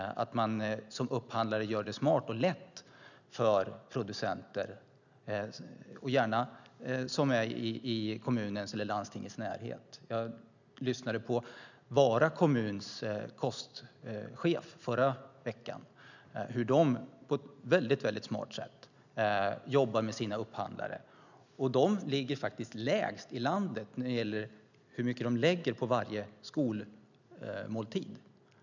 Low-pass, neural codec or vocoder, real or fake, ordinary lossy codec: 7.2 kHz; none; real; none